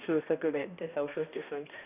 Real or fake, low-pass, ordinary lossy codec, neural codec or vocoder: fake; 3.6 kHz; none; codec, 16 kHz, 1 kbps, X-Codec, HuBERT features, trained on balanced general audio